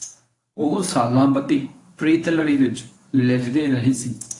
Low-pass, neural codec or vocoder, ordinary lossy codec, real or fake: 10.8 kHz; codec, 24 kHz, 0.9 kbps, WavTokenizer, medium speech release version 1; AAC, 48 kbps; fake